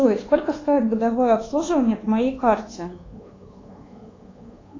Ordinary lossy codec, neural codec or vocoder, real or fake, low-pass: AAC, 32 kbps; codec, 24 kHz, 1.2 kbps, DualCodec; fake; 7.2 kHz